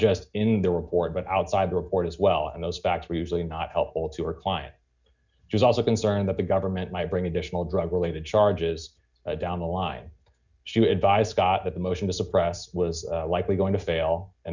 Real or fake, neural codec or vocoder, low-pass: real; none; 7.2 kHz